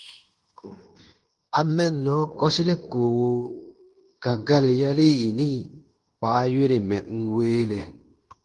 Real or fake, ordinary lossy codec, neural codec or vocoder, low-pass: fake; Opus, 24 kbps; codec, 16 kHz in and 24 kHz out, 0.9 kbps, LongCat-Audio-Codec, fine tuned four codebook decoder; 10.8 kHz